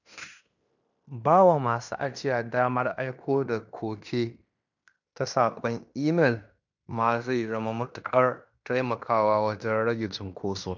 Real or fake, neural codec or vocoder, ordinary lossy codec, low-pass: fake; codec, 16 kHz in and 24 kHz out, 0.9 kbps, LongCat-Audio-Codec, fine tuned four codebook decoder; none; 7.2 kHz